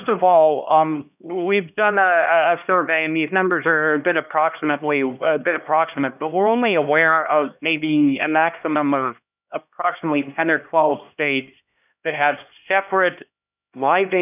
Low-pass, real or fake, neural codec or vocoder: 3.6 kHz; fake; codec, 16 kHz, 1 kbps, X-Codec, HuBERT features, trained on LibriSpeech